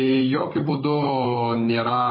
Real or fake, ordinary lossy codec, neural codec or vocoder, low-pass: fake; MP3, 24 kbps; codec, 16 kHz, 16 kbps, FunCodec, trained on Chinese and English, 50 frames a second; 5.4 kHz